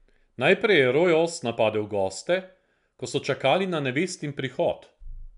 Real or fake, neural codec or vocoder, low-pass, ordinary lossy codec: real; none; 10.8 kHz; none